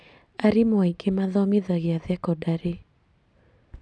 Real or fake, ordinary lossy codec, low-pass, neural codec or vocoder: real; none; none; none